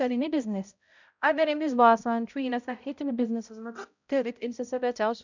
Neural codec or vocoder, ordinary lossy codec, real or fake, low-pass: codec, 16 kHz, 0.5 kbps, X-Codec, HuBERT features, trained on balanced general audio; none; fake; 7.2 kHz